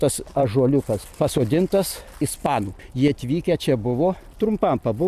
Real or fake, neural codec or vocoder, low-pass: fake; vocoder, 44.1 kHz, 128 mel bands every 256 samples, BigVGAN v2; 14.4 kHz